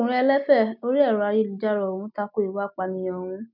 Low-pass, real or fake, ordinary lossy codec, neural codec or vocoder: 5.4 kHz; real; none; none